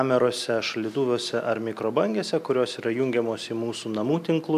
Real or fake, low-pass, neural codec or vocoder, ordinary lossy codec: real; 14.4 kHz; none; AAC, 96 kbps